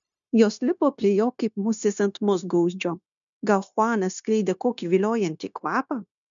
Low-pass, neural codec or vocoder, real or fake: 7.2 kHz; codec, 16 kHz, 0.9 kbps, LongCat-Audio-Codec; fake